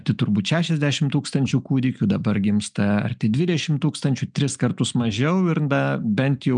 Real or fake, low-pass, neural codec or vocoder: real; 9.9 kHz; none